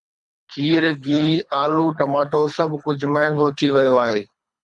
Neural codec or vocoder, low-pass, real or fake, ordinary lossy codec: codec, 24 kHz, 3 kbps, HILCodec; 10.8 kHz; fake; MP3, 96 kbps